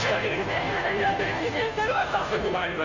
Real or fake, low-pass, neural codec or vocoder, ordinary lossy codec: fake; 7.2 kHz; codec, 16 kHz, 0.5 kbps, FunCodec, trained on Chinese and English, 25 frames a second; none